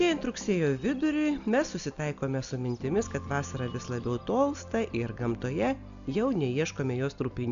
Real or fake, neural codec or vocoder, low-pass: real; none; 7.2 kHz